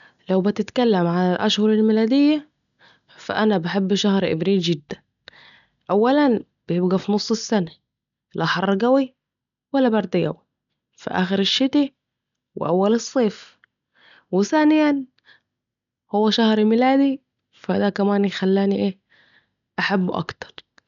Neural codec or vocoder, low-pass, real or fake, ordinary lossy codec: none; 7.2 kHz; real; none